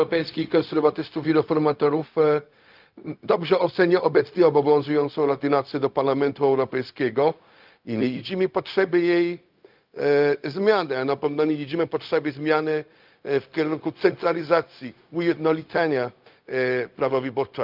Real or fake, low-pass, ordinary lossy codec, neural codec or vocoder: fake; 5.4 kHz; Opus, 24 kbps; codec, 16 kHz, 0.4 kbps, LongCat-Audio-Codec